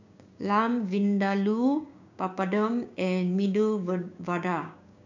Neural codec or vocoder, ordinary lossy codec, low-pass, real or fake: codec, 16 kHz, 6 kbps, DAC; none; 7.2 kHz; fake